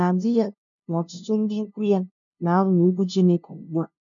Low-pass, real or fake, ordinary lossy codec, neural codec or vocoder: 7.2 kHz; fake; MP3, 64 kbps; codec, 16 kHz, 0.5 kbps, FunCodec, trained on LibriTTS, 25 frames a second